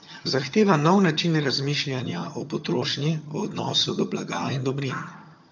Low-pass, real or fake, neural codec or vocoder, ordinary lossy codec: 7.2 kHz; fake; vocoder, 22.05 kHz, 80 mel bands, HiFi-GAN; none